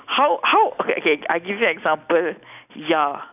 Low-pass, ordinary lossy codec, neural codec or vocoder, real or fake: 3.6 kHz; none; none; real